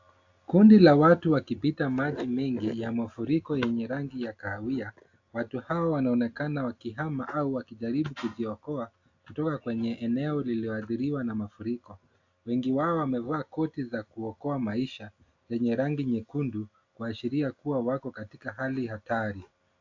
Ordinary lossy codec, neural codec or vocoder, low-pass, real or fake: AAC, 48 kbps; none; 7.2 kHz; real